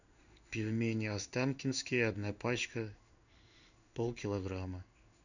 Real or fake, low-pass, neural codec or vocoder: fake; 7.2 kHz; codec, 16 kHz in and 24 kHz out, 1 kbps, XY-Tokenizer